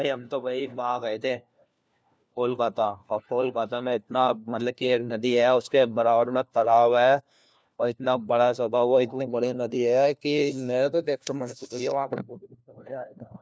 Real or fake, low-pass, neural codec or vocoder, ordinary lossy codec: fake; none; codec, 16 kHz, 1 kbps, FunCodec, trained on LibriTTS, 50 frames a second; none